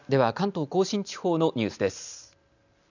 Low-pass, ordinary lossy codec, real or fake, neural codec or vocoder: 7.2 kHz; none; real; none